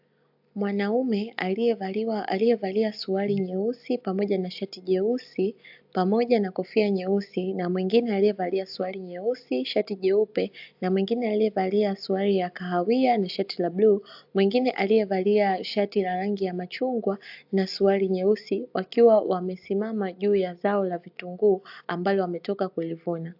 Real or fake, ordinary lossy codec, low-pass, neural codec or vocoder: real; AAC, 48 kbps; 5.4 kHz; none